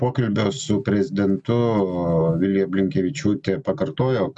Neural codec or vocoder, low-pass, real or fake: none; 10.8 kHz; real